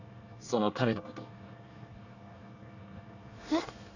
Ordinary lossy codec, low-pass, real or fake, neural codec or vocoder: none; 7.2 kHz; fake; codec, 24 kHz, 1 kbps, SNAC